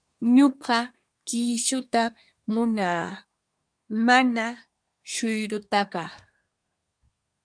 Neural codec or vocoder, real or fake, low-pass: codec, 24 kHz, 1 kbps, SNAC; fake; 9.9 kHz